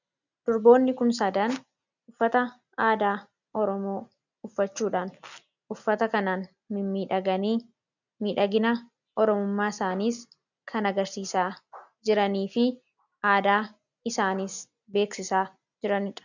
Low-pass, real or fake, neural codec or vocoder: 7.2 kHz; real; none